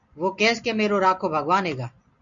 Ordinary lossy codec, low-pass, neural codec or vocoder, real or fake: MP3, 96 kbps; 7.2 kHz; none; real